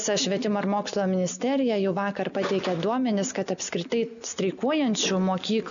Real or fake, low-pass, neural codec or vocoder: real; 7.2 kHz; none